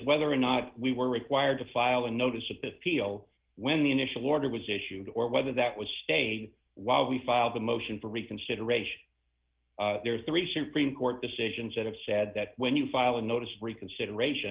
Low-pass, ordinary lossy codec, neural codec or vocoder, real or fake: 3.6 kHz; Opus, 16 kbps; none; real